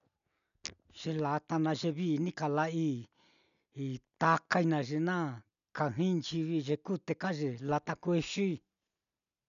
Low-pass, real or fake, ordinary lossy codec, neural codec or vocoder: 7.2 kHz; real; none; none